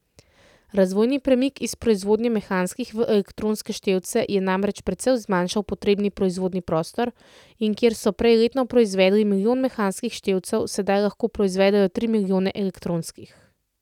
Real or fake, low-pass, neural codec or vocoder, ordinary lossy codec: real; 19.8 kHz; none; none